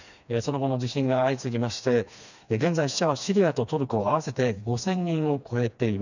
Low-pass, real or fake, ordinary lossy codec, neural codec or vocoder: 7.2 kHz; fake; none; codec, 16 kHz, 2 kbps, FreqCodec, smaller model